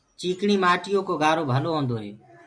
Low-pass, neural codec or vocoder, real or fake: 9.9 kHz; none; real